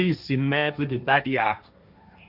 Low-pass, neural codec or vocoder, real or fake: 5.4 kHz; codec, 16 kHz, 1.1 kbps, Voila-Tokenizer; fake